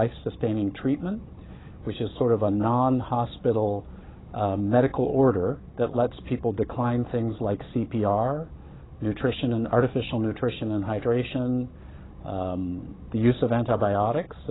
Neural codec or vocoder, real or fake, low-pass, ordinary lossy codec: codec, 16 kHz, 16 kbps, FunCodec, trained on Chinese and English, 50 frames a second; fake; 7.2 kHz; AAC, 16 kbps